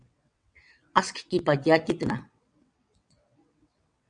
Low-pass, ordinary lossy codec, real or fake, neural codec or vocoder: 9.9 kHz; MP3, 96 kbps; fake; vocoder, 22.05 kHz, 80 mel bands, WaveNeXt